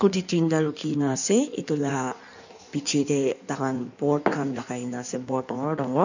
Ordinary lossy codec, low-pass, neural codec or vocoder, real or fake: none; 7.2 kHz; codec, 16 kHz in and 24 kHz out, 1.1 kbps, FireRedTTS-2 codec; fake